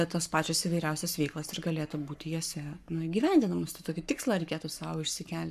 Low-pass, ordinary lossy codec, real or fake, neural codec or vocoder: 14.4 kHz; AAC, 96 kbps; fake; codec, 44.1 kHz, 7.8 kbps, Pupu-Codec